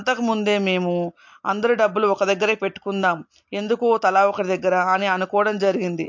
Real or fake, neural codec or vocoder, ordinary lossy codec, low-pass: real; none; MP3, 48 kbps; 7.2 kHz